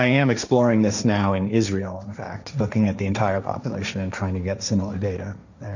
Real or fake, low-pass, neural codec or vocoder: fake; 7.2 kHz; codec, 16 kHz, 1.1 kbps, Voila-Tokenizer